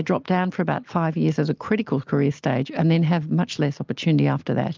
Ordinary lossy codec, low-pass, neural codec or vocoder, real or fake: Opus, 24 kbps; 7.2 kHz; none; real